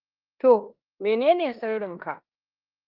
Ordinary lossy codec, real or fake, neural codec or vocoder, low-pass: Opus, 32 kbps; fake; codec, 16 kHz in and 24 kHz out, 0.9 kbps, LongCat-Audio-Codec, four codebook decoder; 5.4 kHz